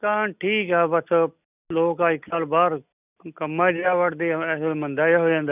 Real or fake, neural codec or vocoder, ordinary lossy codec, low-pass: real; none; none; 3.6 kHz